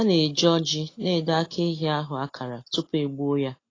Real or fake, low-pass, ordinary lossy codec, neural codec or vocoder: real; 7.2 kHz; AAC, 32 kbps; none